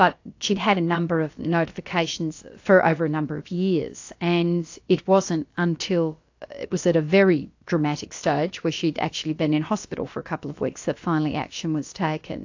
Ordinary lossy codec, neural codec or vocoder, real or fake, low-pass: AAC, 48 kbps; codec, 16 kHz, about 1 kbps, DyCAST, with the encoder's durations; fake; 7.2 kHz